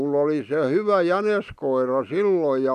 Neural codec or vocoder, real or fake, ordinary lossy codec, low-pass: none; real; none; 14.4 kHz